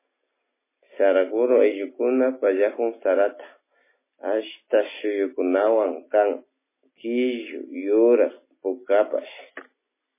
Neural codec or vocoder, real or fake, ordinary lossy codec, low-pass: none; real; MP3, 16 kbps; 3.6 kHz